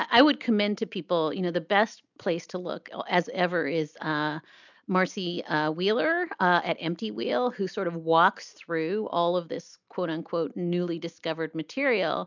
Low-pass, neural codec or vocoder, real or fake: 7.2 kHz; none; real